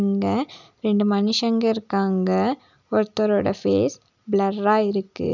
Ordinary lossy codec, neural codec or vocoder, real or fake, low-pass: none; none; real; 7.2 kHz